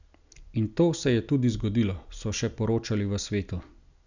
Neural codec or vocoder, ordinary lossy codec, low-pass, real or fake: none; none; 7.2 kHz; real